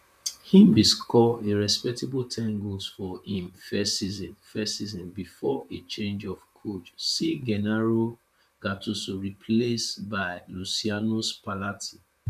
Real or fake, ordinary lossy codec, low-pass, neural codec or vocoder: fake; none; 14.4 kHz; vocoder, 44.1 kHz, 128 mel bands, Pupu-Vocoder